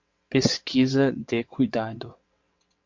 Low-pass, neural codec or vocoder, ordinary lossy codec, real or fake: 7.2 kHz; vocoder, 22.05 kHz, 80 mel bands, WaveNeXt; MP3, 48 kbps; fake